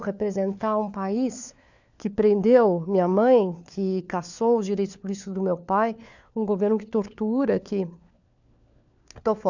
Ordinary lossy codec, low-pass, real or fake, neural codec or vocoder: none; 7.2 kHz; fake; codec, 16 kHz, 4 kbps, FunCodec, trained on LibriTTS, 50 frames a second